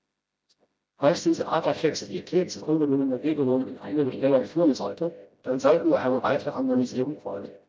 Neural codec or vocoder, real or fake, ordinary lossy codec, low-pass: codec, 16 kHz, 0.5 kbps, FreqCodec, smaller model; fake; none; none